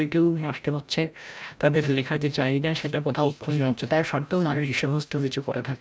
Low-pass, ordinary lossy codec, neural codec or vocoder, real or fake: none; none; codec, 16 kHz, 0.5 kbps, FreqCodec, larger model; fake